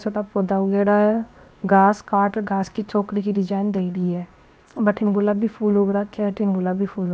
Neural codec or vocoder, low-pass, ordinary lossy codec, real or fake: codec, 16 kHz, 0.7 kbps, FocalCodec; none; none; fake